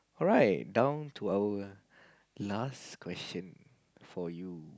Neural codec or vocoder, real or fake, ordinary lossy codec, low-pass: none; real; none; none